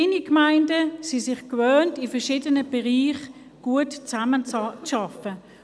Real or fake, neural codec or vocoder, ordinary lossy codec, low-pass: real; none; none; none